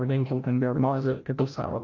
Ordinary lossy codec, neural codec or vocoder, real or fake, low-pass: AAC, 48 kbps; codec, 16 kHz, 0.5 kbps, FreqCodec, larger model; fake; 7.2 kHz